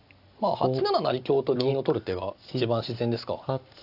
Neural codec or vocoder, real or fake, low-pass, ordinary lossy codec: none; real; 5.4 kHz; AAC, 48 kbps